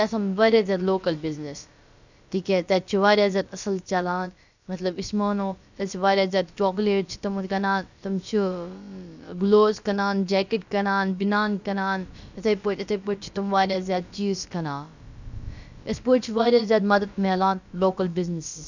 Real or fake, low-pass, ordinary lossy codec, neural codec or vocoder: fake; 7.2 kHz; none; codec, 16 kHz, about 1 kbps, DyCAST, with the encoder's durations